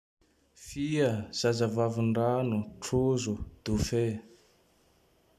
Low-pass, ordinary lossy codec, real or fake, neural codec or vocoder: 14.4 kHz; none; real; none